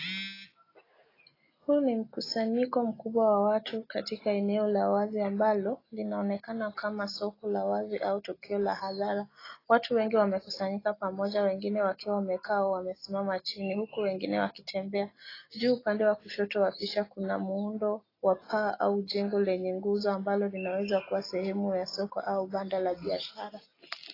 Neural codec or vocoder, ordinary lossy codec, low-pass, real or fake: none; AAC, 24 kbps; 5.4 kHz; real